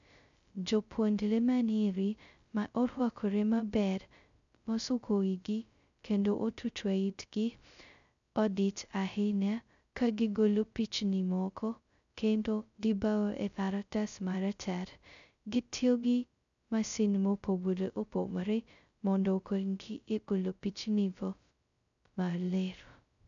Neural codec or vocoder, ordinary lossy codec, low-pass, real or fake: codec, 16 kHz, 0.2 kbps, FocalCodec; none; 7.2 kHz; fake